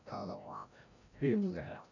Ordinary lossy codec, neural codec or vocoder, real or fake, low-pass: none; codec, 16 kHz, 0.5 kbps, FreqCodec, larger model; fake; 7.2 kHz